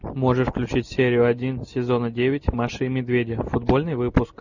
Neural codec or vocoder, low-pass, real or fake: none; 7.2 kHz; real